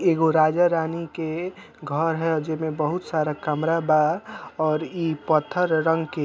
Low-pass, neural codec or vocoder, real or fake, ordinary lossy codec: none; none; real; none